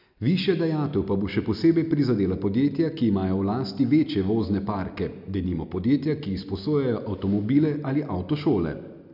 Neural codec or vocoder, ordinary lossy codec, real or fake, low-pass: none; none; real; 5.4 kHz